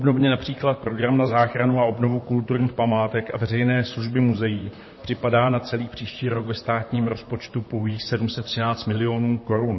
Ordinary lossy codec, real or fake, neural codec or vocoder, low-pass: MP3, 24 kbps; fake; vocoder, 44.1 kHz, 128 mel bands, Pupu-Vocoder; 7.2 kHz